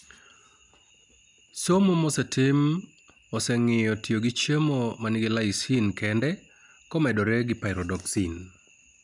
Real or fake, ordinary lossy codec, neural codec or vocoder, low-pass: real; none; none; 10.8 kHz